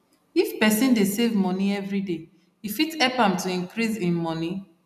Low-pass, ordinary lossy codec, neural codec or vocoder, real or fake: 14.4 kHz; none; none; real